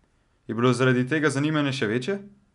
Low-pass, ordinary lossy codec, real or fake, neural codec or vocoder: 10.8 kHz; none; real; none